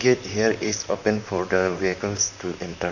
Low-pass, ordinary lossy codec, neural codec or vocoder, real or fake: 7.2 kHz; none; vocoder, 44.1 kHz, 128 mel bands, Pupu-Vocoder; fake